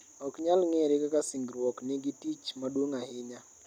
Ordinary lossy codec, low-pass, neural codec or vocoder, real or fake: none; 19.8 kHz; none; real